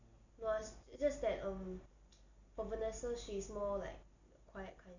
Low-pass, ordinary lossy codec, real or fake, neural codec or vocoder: 7.2 kHz; none; real; none